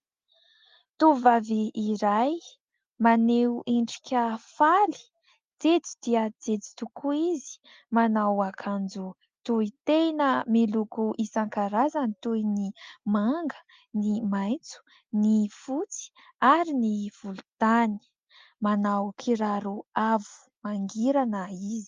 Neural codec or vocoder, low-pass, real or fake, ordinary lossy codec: none; 7.2 kHz; real; Opus, 32 kbps